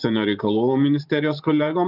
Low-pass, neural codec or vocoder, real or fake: 5.4 kHz; vocoder, 44.1 kHz, 128 mel bands every 512 samples, BigVGAN v2; fake